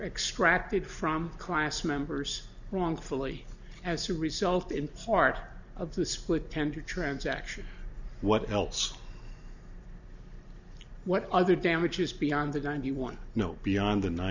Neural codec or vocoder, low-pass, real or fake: none; 7.2 kHz; real